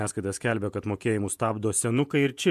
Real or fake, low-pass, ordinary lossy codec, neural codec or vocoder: fake; 14.4 kHz; MP3, 96 kbps; vocoder, 48 kHz, 128 mel bands, Vocos